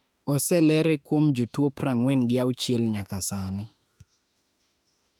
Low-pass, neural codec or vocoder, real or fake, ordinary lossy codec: 19.8 kHz; autoencoder, 48 kHz, 32 numbers a frame, DAC-VAE, trained on Japanese speech; fake; none